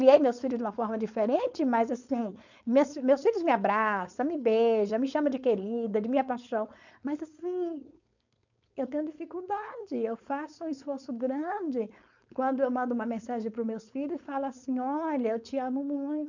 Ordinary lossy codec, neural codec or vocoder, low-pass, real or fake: none; codec, 16 kHz, 4.8 kbps, FACodec; 7.2 kHz; fake